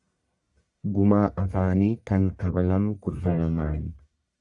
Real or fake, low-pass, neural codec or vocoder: fake; 10.8 kHz; codec, 44.1 kHz, 1.7 kbps, Pupu-Codec